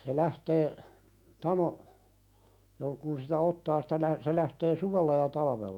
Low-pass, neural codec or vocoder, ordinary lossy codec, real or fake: 19.8 kHz; autoencoder, 48 kHz, 128 numbers a frame, DAC-VAE, trained on Japanese speech; MP3, 96 kbps; fake